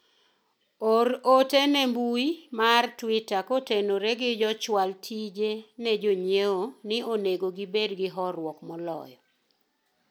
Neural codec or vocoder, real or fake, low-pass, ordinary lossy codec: none; real; none; none